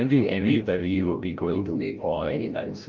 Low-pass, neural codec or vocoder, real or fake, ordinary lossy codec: 7.2 kHz; codec, 16 kHz, 0.5 kbps, FreqCodec, larger model; fake; Opus, 32 kbps